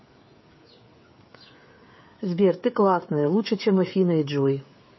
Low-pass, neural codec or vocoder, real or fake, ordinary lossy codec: 7.2 kHz; codec, 16 kHz, 16 kbps, FreqCodec, smaller model; fake; MP3, 24 kbps